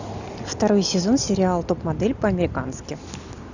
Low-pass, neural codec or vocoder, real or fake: 7.2 kHz; none; real